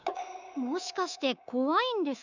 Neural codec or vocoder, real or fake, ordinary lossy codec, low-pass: codec, 24 kHz, 3.1 kbps, DualCodec; fake; none; 7.2 kHz